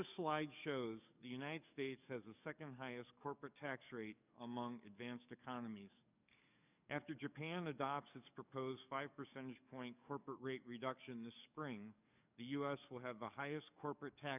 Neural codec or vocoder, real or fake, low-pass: codec, 44.1 kHz, 7.8 kbps, DAC; fake; 3.6 kHz